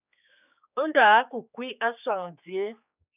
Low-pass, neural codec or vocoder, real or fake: 3.6 kHz; codec, 16 kHz, 4 kbps, X-Codec, HuBERT features, trained on general audio; fake